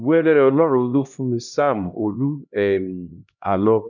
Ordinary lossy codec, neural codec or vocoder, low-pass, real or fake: none; codec, 16 kHz, 1 kbps, X-Codec, HuBERT features, trained on LibriSpeech; 7.2 kHz; fake